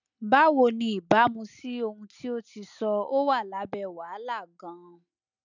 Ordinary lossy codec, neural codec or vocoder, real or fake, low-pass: none; none; real; 7.2 kHz